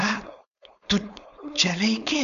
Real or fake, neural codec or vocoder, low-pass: fake; codec, 16 kHz, 4.8 kbps, FACodec; 7.2 kHz